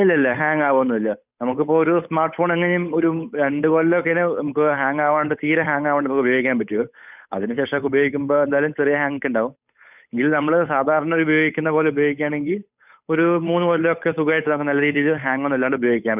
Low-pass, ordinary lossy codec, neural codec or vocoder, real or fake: 3.6 kHz; none; codec, 16 kHz, 8 kbps, FunCodec, trained on Chinese and English, 25 frames a second; fake